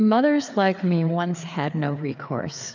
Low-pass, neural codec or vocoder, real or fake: 7.2 kHz; codec, 16 kHz, 4 kbps, FreqCodec, larger model; fake